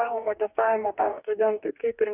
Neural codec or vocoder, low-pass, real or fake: codec, 44.1 kHz, 2.6 kbps, DAC; 3.6 kHz; fake